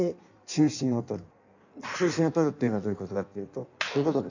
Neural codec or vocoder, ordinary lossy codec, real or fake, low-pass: codec, 16 kHz in and 24 kHz out, 1.1 kbps, FireRedTTS-2 codec; none; fake; 7.2 kHz